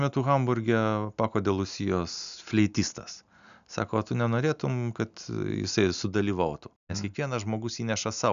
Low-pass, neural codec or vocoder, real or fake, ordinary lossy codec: 7.2 kHz; none; real; MP3, 96 kbps